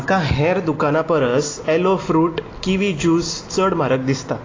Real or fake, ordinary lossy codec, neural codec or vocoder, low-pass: real; AAC, 32 kbps; none; 7.2 kHz